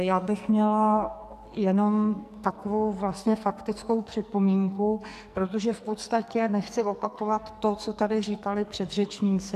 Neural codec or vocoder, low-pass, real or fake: codec, 44.1 kHz, 2.6 kbps, SNAC; 14.4 kHz; fake